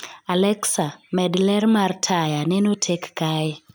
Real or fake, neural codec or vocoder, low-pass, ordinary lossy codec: real; none; none; none